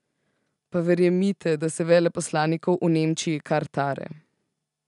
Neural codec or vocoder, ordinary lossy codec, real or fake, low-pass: none; none; real; 10.8 kHz